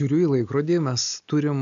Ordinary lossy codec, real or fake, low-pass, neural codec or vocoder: AAC, 96 kbps; real; 7.2 kHz; none